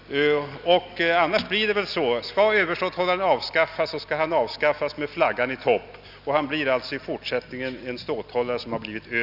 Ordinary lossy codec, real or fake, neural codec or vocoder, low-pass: none; real; none; 5.4 kHz